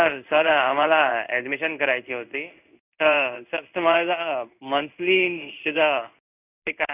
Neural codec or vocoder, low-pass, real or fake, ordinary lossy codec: codec, 16 kHz in and 24 kHz out, 1 kbps, XY-Tokenizer; 3.6 kHz; fake; none